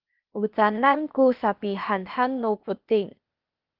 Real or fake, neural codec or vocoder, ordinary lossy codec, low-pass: fake; codec, 16 kHz, 0.8 kbps, ZipCodec; Opus, 32 kbps; 5.4 kHz